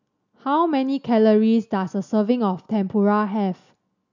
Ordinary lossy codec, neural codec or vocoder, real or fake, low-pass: none; none; real; 7.2 kHz